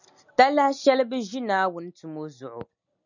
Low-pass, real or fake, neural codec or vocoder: 7.2 kHz; real; none